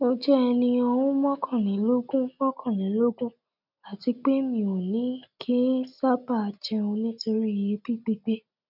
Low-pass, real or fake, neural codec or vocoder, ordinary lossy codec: 5.4 kHz; real; none; none